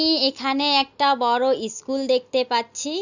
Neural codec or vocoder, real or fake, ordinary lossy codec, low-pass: none; real; none; 7.2 kHz